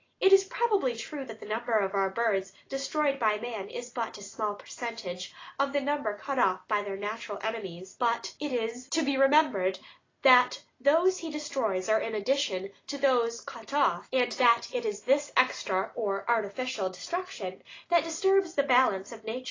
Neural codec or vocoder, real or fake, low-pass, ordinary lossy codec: none; real; 7.2 kHz; AAC, 32 kbps